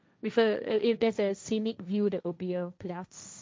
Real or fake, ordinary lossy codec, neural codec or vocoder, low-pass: fake; none; codec, 16 kHz, 1.1 kbps, Voila-Tokenizer; none